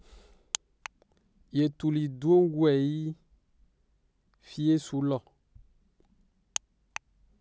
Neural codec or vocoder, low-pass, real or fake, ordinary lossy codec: none; none; real; none